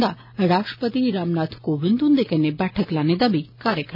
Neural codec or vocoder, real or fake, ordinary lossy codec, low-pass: none; real; none; 5.4 kHz